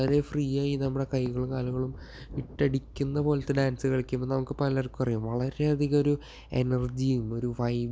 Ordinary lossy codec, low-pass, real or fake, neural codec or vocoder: none; none; real; none